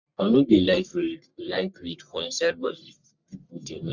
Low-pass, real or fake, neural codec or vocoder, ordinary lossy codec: 7.2 kHz; fake; codec, 44.1 kHz, 1.7 kbps, Pupu-Codec; none